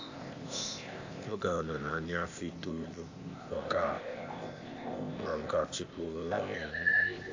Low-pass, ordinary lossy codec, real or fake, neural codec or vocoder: 7.2 kHz; AAC, 48 kbps; fake; codec, 16 kHz, 0.8 kbps, ZipCodec